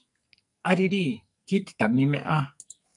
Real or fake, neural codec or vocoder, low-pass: fake; codec, 44.1 kHz, 2.6 kbps, SNAC; 10.8 kHz